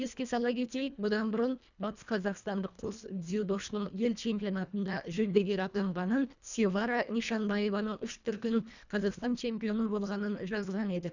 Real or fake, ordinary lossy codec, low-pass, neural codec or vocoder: fake; none; 7.2 kHz; codec, 24 kHz, 1.5 kbps, HILCodec